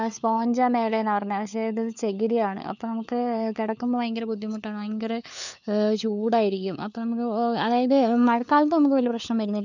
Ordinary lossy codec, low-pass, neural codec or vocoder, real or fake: none; 7.2 kHz; codec, 16 kHz, 4 kbps, FunCodec, trained on LibriTTS, 50 frames a second; fake